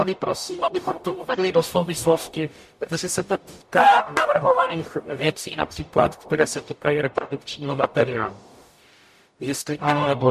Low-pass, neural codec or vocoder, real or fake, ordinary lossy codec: 14.4 kHz; codec, 44.1 kHz, 0.9 kbps, DAC; fake; MP3, 64 kbps